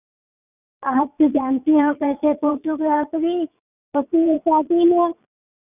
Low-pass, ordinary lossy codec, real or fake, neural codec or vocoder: 3.6 kHz; none; fake; vocoder, 22.05 kHz, 80 mel bands, Vocos